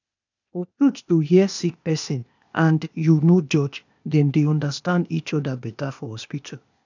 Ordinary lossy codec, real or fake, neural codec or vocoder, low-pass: none; fake; codec, 16 kHz, 0.8 kbps, ZipCodec; 7.2 kHz